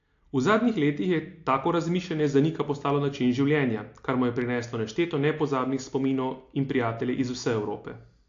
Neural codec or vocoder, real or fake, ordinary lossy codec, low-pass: none; real; AAC, 48 kbps; 7.2 kHz